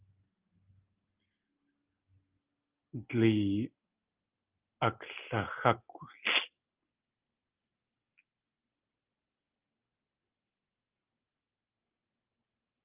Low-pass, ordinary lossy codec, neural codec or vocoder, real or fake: 3.6 kHz; Opus, 24 kbps; none; real